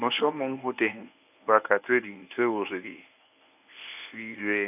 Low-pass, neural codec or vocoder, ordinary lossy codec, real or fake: 3.6 kHz; codec, 24 kHz, 0.9 kbps, WavTokenizer, medium speech release version 1; none; fake